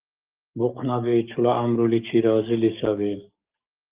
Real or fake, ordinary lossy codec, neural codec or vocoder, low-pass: fake; Opus, 24 kbps; codec, 44.1 kHz, 7.8 kbps, DAC; 3.6 kHz